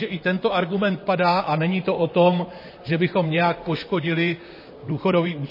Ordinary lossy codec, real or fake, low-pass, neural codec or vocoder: MP3, 24 kbps; fake; 5.4 kHz; vocoder, 44.1 kHz, 128 mel bands, Pupu-Vocoder